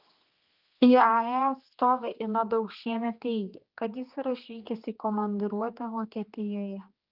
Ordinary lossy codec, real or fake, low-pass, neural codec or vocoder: Opus, 24 kbps; fake; 5.4 kHz; codec, 16 kHz, 2 kbps, X-Codec, HuBERT features, trained on general audio